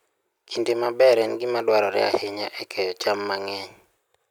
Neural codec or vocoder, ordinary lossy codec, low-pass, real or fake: none; none; none; real